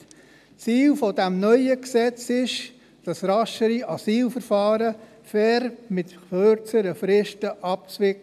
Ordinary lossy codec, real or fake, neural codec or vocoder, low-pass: none; real; none; 14.4 kHz